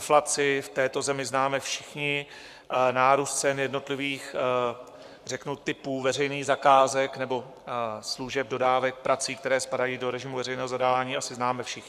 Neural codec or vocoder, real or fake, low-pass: codec, 44.1 kHz, 7.8 kbps, Pupu-Codec; fake; 14.4 kHz